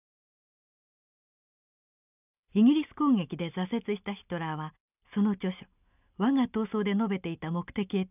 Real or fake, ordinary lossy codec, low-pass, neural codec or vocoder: real; none; 3.6 kHz; none